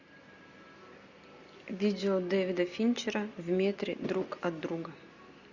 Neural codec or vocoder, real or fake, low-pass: none; real; 7.2 kHz